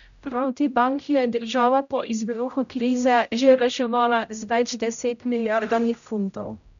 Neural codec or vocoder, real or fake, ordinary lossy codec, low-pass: codec, 16 kHz, 0.5 kbps, X-Codec, HuBERT features, trained on general audio; fake; none; 7.2 kHz